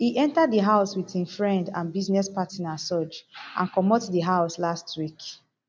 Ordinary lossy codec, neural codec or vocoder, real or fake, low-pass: none; none; real; none